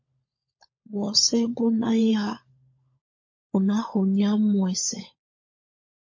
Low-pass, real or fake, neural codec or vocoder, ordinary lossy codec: 7.2 kHz; fake; codec, 16 kHz, 16 kbps, FunCodec, trained on LibriTTS, 50 frames a second; MP3, 32 kbps